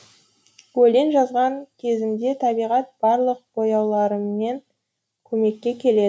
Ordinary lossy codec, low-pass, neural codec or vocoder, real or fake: none; none; none; real